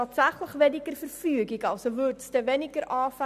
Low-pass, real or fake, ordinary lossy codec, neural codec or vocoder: 14.4 kHz; real; none; none